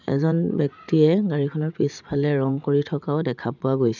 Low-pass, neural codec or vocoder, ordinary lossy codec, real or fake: 7.2 kHz; vocoder, 44.1 kHz, 80 mel bands, Vocos; none; fake